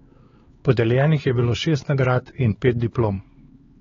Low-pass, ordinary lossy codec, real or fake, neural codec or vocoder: 7.2 kHz; AAC, 24 kbps; fake; codec, 16 kHz, 4 kbps, X-Codec, HuBERT features, trained on LibriSpeech